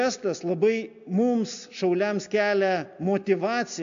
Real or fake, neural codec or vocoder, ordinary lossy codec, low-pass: real; none; AAC, 64 kbps; 7.2 kHz